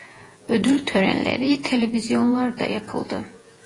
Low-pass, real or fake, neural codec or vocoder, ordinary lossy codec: 10.8 kHz; fake; vocoder, 48 kHz, 128 mel bands, Vocos; AAC, 32 kbps